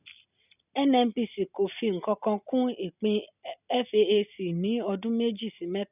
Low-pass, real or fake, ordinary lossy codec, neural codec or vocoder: 3.6 kHz; real; none; none